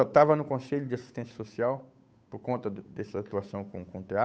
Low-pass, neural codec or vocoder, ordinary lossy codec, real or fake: none; codec, 16 kHz, 8 kbps, FunCodec, trained on Chinese and English, 25 frames a second; none; fake